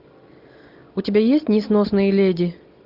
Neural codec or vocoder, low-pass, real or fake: none; 5.4 kHz; real